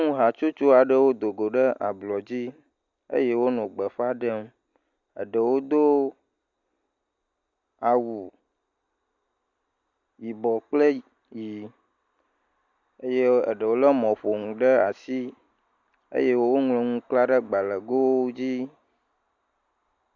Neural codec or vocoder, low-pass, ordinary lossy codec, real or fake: none; 7.2 kHz; Opus, 64 kbps; real